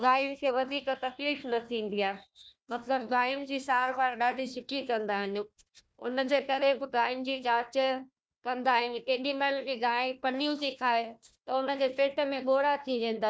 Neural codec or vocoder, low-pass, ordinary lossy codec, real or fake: codec, 16 kHz, 1 kbps, FunCodec, trained on Chinese and English, 50 frames a second; none; none; fake